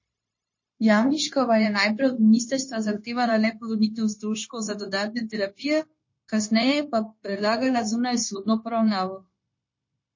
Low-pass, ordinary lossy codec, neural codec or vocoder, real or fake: 7.2 kHz; MP3, 32 kbps; codec, 16 kHz, 0.9 kbps, LongCat-Audio-Codec; fake